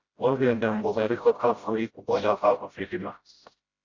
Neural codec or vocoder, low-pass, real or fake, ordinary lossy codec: codec, 16 kHz, 0.5 kbps, FreqCodec, smaller model; 7.2 kHz; fake; Opus, 64 kbps